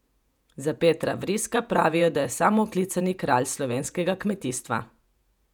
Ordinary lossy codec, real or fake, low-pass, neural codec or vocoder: none; fake; 19.8 kHz; vocoder, 48 kHz, 128 mel bands, Vocos